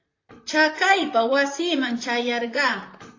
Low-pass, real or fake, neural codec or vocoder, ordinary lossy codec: 7.2 kHz; fake; vocoder, 44.1 kHz, 128 mel bands, Pupu-Vocoder; AAC, 32 kbps